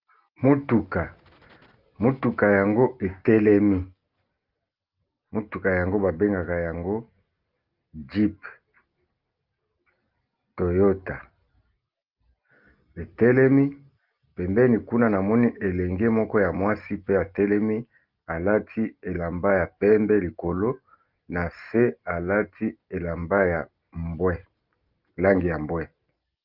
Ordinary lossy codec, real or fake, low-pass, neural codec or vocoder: Opus, 32 kbps; real; 5.4 kHz; none